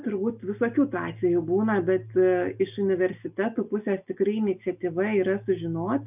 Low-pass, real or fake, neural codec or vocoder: 3.6 kHz; real; none